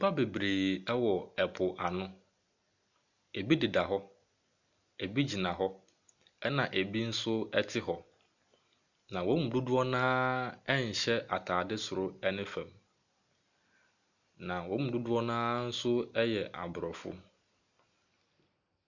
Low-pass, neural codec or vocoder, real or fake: 7.2 kHz; none; real